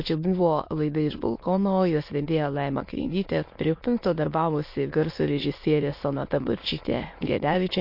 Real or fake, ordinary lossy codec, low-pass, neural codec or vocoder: fake; MP3, 32 kbps; 5.4 kHz; autoencoder, 22.05 kHz, a latent of 192 numbers a frame, VITS, trained on many speakers